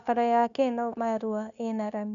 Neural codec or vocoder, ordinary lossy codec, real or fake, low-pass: codec, 16 kHz, 0.9 kbps, LongCat-Audio-Codec; none; fake; 7.2 kHz